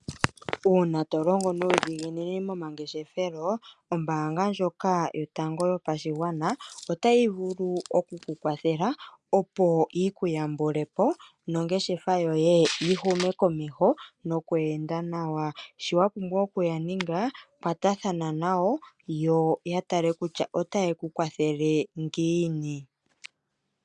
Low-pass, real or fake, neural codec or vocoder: 10.8 kHz; real; none